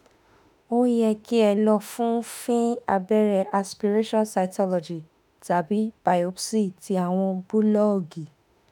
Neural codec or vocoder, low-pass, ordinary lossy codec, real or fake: autoencoder, 48 kHz, 32 numbers a frame, DAC-VAE, trained on Japanese speech; none; none; fake